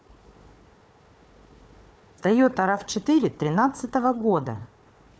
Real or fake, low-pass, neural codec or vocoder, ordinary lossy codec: fake; none; codec, 16 kHz, 4 kbps, FunCodec, trained on Chinese and English, 50 frames a second; none